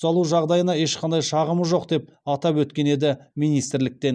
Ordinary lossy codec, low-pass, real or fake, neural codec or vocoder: none; none; real; none